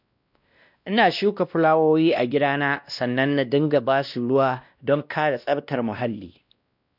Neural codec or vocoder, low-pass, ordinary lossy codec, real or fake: codec, 16 kHz, 1 kbps, X-Codec, WavLM features, trained on Multilingual LibriSpeech; 5.4 kHz; none; fake